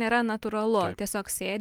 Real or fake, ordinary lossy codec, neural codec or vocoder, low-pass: real; Opus, 32 kbps; none; 19.8 kHz